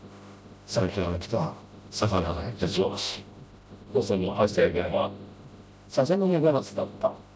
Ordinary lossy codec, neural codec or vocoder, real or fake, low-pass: none; codec, 16 kHz, 0.5 kbps, FreqCodec, smaller model; fake; none